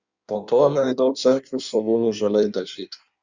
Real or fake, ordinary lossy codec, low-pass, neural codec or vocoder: fake; Opus, 64 kbps; 7.2 kHz; codec, 16 kHz in and 24 kHz out, 1.1 kbps, FireRedTTS-2 codec